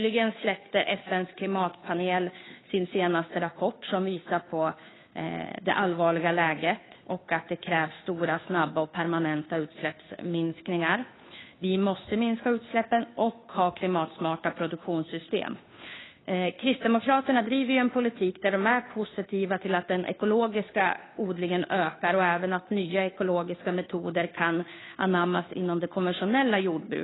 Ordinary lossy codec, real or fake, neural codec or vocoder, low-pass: AAC, 16 kbps; fake; codec, 16 kHz, 2 kbps, FunCodec, trained on Chinese and English, 25 frames a second; 7.2 kHz